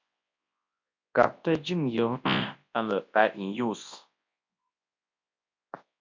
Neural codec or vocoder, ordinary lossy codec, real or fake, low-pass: codec, 24 kHz, 0.9 kbps, WavTokenizer, large speech release; MP3, 48 kbps; fake; 7.2 kHz